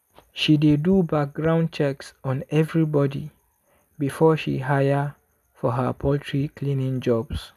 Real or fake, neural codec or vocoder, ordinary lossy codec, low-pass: real; none; none; 14.4 kHz